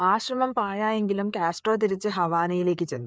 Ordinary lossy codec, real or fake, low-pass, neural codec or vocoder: none; fake; none; codec, 16 kHz, 4 kbps, FreqCodec, larger model